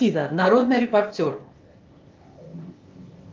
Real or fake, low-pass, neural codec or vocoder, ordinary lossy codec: fake; 7.2 kHz; codec, 16 kHz, 0.8 kbps, ZipCodec; Opus, 32 kbps